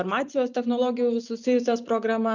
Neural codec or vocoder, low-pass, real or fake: none; 7.2 kHz; real